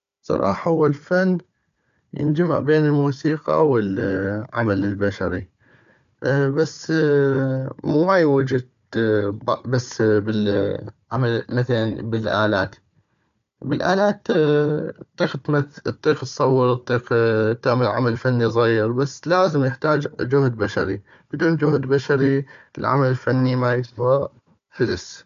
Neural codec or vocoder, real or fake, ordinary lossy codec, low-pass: codec, 16 kHz, 4 kbps, FunCodec, trained on Chinese and English, 50 frames a second; fake; MP3, 64 kbps; 7.2 kHz